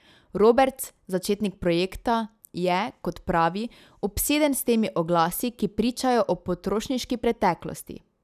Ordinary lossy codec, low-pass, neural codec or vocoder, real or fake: none; 14.4 kHz; none; real